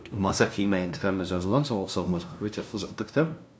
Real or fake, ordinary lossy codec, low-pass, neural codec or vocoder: fake; none; none; codec, 16 kHz, 0.5 kbps, FunCodec, trained on LibriTTS, 25 frames a second